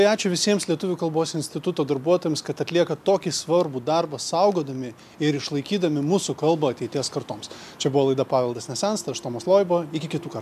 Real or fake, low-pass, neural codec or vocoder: real; 14.4 kHz; none